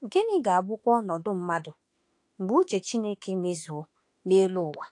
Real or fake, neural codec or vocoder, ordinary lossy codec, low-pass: fake; autoencoder, 48 kHz, 32 numbers a frame, DAC-VAE, trained on Japanese speech; AAC, 48 kbps; 10.8 kHz